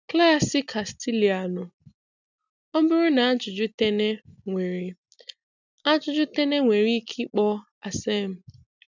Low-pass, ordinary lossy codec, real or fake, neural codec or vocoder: 7.2 kHz; none; real; none